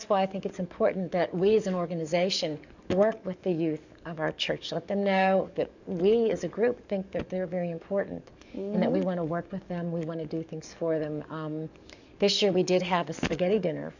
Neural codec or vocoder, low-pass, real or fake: codec, 44.1 kHz, 7.8 kbps, DAC; 7.2 kHz; fake